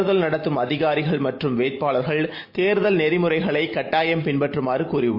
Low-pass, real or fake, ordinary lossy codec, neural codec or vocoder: 5.4 kHz; real; MP3, 32 kbps; none